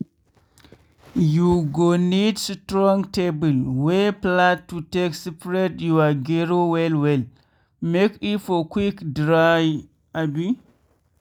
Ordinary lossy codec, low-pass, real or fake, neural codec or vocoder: none; 19.8 kHz; real; none